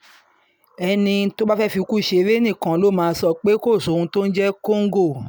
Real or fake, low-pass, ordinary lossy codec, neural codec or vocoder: real; none; none; none